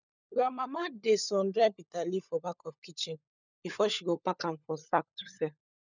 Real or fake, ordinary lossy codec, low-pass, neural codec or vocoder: fake; none; 7.2 kHz; codec, 16 kHz, 16 kbps, FunCodec, trained on LibriTTS, 50 frames a second